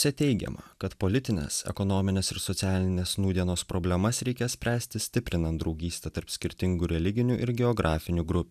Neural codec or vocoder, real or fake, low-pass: none; real; 14.4 kHz